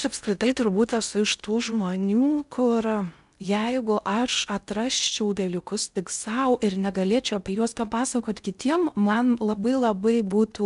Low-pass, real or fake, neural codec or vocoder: 10.8 kHz; fake; codec, 16 kHz in and 24 kHz out, 0.6 kbps, FocalCodec, streaming, 2048 codes